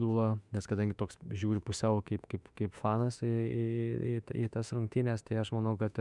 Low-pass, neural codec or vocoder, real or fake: 10.8 kHz; autoencoder, 48 kHz, 32 numbers a frame, DAC-VAE, trained on Japanese speech; fake